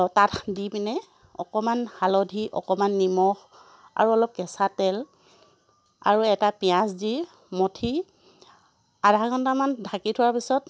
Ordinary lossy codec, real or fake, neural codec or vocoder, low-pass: none; real; none; none